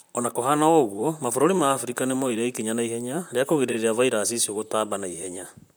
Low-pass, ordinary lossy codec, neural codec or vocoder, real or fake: none; none; vocoder, 44.1 kHz, 128 mel bands, Pupu-Vocoder; fake